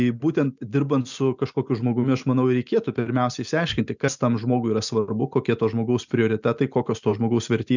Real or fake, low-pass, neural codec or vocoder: real; 7.2 kHz; none